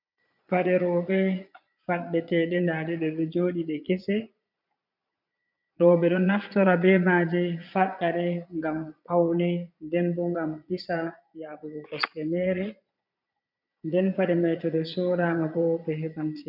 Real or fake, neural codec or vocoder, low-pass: fake; vocoder, 22.05 kHz, 80 mel bands, Vocos; 5.4 kHz